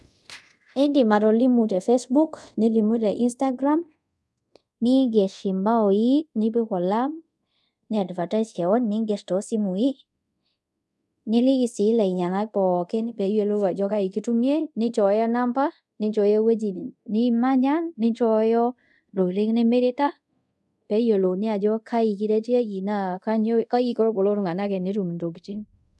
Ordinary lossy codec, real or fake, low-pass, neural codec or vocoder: none; fake; none; codec, 24 kHz, 0.5 kbps, DualCodec